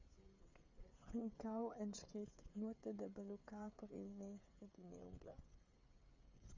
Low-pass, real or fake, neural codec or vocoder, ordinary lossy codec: 7.2 kHz; fake; codec, 16 kHz, 16 kbps, FreqCodec, smaller model; MP3, 64 kbps